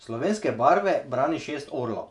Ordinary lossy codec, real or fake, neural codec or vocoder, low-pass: none; fake; vocoder, 48 kHz, 128 mel bands, Vocos; 10.8 kHz